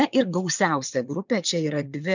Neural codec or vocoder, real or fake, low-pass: vocoder, 22.05 kHz, 80 mel bands, WaveNeXt; fake; 7.2 kHz